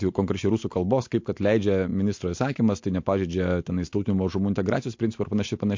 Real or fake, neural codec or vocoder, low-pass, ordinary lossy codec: real; none; 7.2 kHz; MP3, 48 kbps